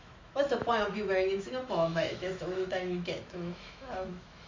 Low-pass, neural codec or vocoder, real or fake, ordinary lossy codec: 7.2 kHz; autoencoder, 48 kHz, 128 numbers a frame, DAC-VAE, trained on Japanese speech; fake; MP3, 48 kbps